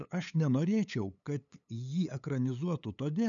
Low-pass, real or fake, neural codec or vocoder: 7.2 kHz; fake; codec, 16 kHz, 8 kbps, FunCodec, trained on LibriTTS, 25 frames a second